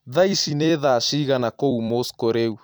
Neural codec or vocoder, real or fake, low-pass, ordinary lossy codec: vocoder, 44.1 kHz, 128 mel bands every 256 samples, BigVGAN v2; fake; none; none